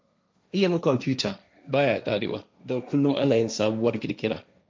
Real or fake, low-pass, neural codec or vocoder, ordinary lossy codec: fake; none; codec, 16 kHz, 1.1 kbps, Voila-Tokenizer; none